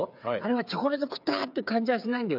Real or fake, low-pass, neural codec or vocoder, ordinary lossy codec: fake; 5.4 kHz; codec, 16 kHz, 8 kbps, FreqCodec, smaller model; none